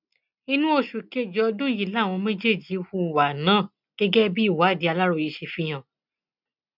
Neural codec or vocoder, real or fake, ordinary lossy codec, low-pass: none; real; none; 5.4 kHz